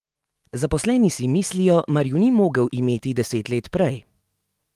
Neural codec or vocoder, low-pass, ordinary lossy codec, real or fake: autoencoder, 48 kHz, 128 numbers a frame, DAC-VAE, trained on Japanese speech; 14.4 kHz; Opus, 24 kbps; fake